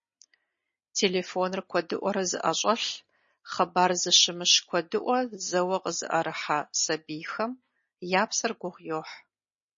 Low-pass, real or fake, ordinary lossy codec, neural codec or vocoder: 7.2 kHz; real; MP3, 32 kbps; none